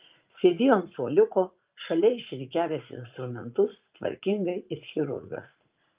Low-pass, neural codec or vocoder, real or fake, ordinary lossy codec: 3.6 kHz; vocoder, 44.1 kHz, 80 mel bands, Vocos; fake; Opus, 24 kbps